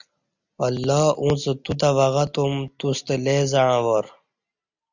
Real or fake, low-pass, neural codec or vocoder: real; 7.2 kHz; none